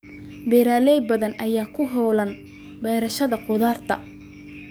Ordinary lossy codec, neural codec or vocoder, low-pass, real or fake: none; codec, 44.1 kHz, 7.8 kbps, DAC; none; fake